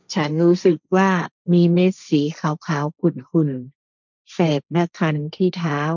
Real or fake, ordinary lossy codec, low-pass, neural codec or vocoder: fake; none; 7.2 kHz; codec, 16 kHz, 1.1 kbps, Voila-Tokenizer